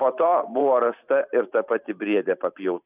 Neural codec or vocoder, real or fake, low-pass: vocoder, 44.1 kHz, 128 mel bands every 512 samples, BigVGAN v2; fake; 3.6 kHz